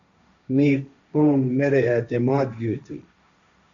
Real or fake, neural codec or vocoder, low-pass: fake; codec, 16 kHz, 1.1 kbps, Voila-Tokenizer; 7.2 kHz